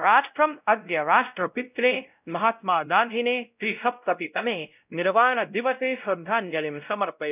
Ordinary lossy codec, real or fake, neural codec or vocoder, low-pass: none; fake; codec, 16 kHz, 0.5 kbps, X-Codec, WavLM features, trained on Multilingual LibriSpeech; 3.6 kHz